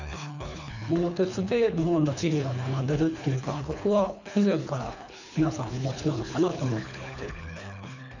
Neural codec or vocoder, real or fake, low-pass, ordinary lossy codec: codec, 24 kHz, 3 kbps, HILCodec; fake; 7.2 kHz; none